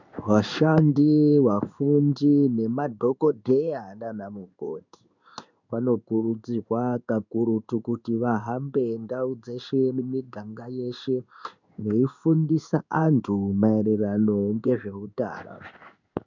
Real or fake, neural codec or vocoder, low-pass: fake; codec, 16 kHz in and 24 kHz out, 1 kbps, XY-Tokenizer; 7.2 kHz